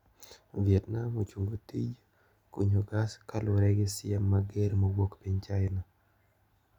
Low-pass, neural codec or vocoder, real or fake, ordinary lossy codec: 19.8 kHz; none; real; none